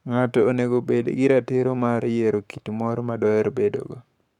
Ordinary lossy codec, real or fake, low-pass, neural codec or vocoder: none; fake; 19.8 kHz; codec, 44.1 kHz, 7.8 kbps, Pupu-Codec